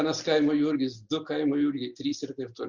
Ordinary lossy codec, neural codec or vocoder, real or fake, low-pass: Opus, 64 kbps; none; real; 7.2 kHz